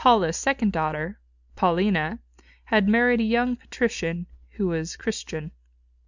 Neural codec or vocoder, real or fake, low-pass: none; real; 7.2 kHz